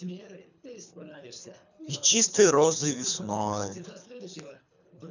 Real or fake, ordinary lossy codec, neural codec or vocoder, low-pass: fake; none; codec, 24 kHz, 3 kbps, HILCodec; 7.2 kHz